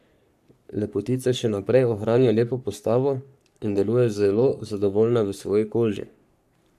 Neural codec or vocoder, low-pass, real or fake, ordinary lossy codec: codec, 44.1 kHz, 3.4 kbps, Pupu-Codec; 14.4 kHz; fake; none